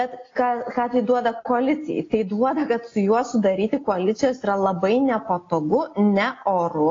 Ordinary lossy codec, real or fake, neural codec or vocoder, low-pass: AAC, 32 kbps; real; none; 7.2 kHz